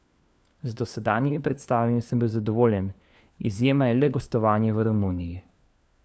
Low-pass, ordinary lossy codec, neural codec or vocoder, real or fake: none; none; codec, 16 kHz, 4 kbps, FunCodec, trained on LibriTTS, 50 frames a second; fake